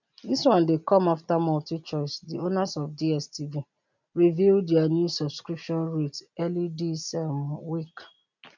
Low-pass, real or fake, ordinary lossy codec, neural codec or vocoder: 7.2 kHz; real; none; none